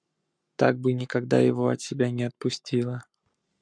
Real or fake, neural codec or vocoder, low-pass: fake; codec, 44.1 kHz, 7.8 kbps, Pupu-Codec; 9.9 kHz